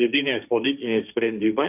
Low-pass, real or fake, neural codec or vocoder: 3.6 kHz; fake; codec, 16 kHz, 1.1 kbps, Voila-Tokenizer